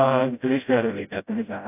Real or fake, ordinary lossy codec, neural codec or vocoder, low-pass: fake; AAC, 32 kbps; codec, 16 kHz, 0.5 kbps, FreqCodec, smaller model; 3.6 kHz